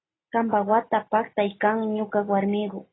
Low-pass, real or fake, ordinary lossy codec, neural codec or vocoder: 7.2 kHz; real; AAC, 16 kbps; none